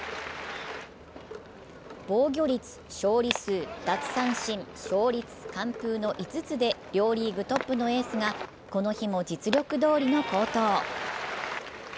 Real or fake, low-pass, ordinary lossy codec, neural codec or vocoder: real; none; none; none